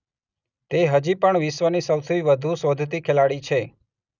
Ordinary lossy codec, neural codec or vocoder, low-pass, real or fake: none; none; 7.2 kHz; real